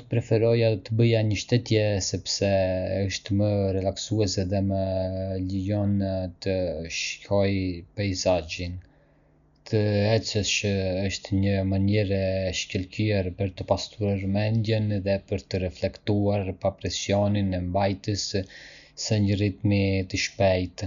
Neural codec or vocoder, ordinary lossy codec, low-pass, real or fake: none; none; 7.2 kHz; real